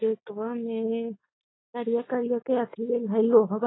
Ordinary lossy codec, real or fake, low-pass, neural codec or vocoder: AAC, 16 kbps; real; 7.2 kHz; none